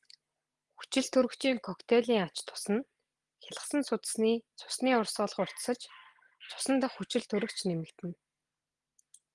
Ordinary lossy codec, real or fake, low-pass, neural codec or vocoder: Opus, 24 kbps; real; 10.8 kHz; none